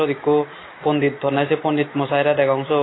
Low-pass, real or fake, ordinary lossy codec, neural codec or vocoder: 7.2 kHz; real; AAC, 16 kbps; none